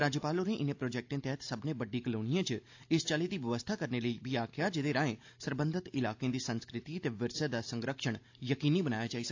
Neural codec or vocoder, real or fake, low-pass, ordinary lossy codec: none; real; 7.2 kHz; AAC, 48 kbps